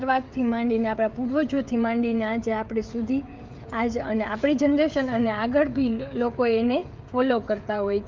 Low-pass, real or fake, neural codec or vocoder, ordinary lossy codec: 7.2 kHz; fake; codec, 16 kHz, 16 kbps, FreqCodec, smaller model; Opus, 24 kbps